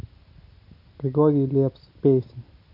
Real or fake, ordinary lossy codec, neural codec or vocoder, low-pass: real; none; none; 5.4 kHz